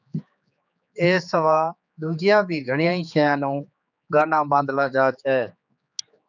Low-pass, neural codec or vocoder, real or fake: 7.2 kHz; codec, 16 kHz, 4 kbps, X-Codec, HuBERT features, trained on general audio; fake